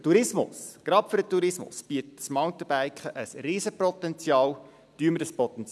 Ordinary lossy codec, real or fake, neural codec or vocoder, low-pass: none; real; none; none